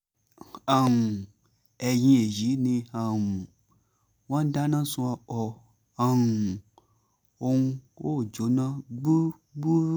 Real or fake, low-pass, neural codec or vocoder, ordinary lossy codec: real; none; none; none